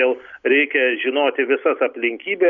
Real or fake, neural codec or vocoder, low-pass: real; none; 7.2 kHz